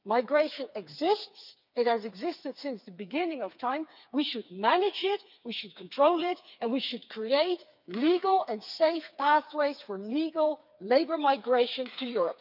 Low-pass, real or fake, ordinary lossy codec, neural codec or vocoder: 5.4 kHz; fake; none; codec, 16 kHz, 4 kbps, FreqCodec, smaller model